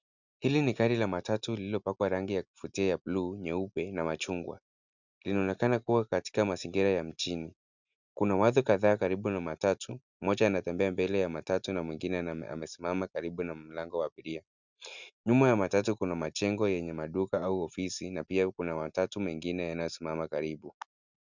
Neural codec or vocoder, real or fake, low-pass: none; real; 7.2 kHz